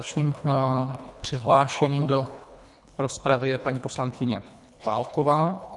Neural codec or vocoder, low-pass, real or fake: codec, 24 kHz, 1.5 kbps, HILCodec; 10.8 kHz; fake